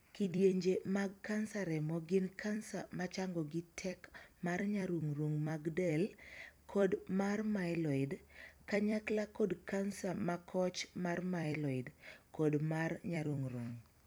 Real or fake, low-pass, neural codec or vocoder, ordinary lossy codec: fake; none; vocoder, 44.1 kHz, 128 mel bands every 256 samples, BigVGAN v2; none